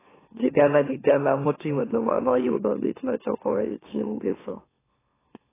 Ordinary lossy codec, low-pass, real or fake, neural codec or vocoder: AAC, 16 kbps; 3.6 kHz; fake; autoencoder, 44.1 kHz, a latent of 192 numbers a frame, MeloTTS